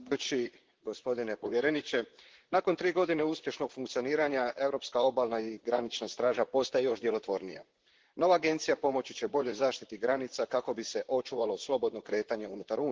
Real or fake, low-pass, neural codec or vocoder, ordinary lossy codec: fake; 7.2 kHz; vocoder, 44.1 kHz, 128 mel bands, Pupu-Vocoder; Opus, 16 kbps